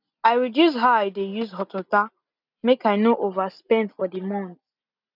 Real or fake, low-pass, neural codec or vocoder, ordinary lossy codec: real; 5.4 kHz; none; none